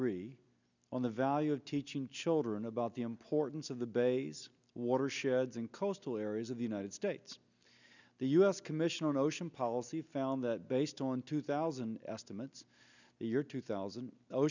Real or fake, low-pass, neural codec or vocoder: real; 7.2 kHz; none